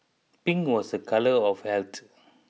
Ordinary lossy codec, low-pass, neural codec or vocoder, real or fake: none; none; none; real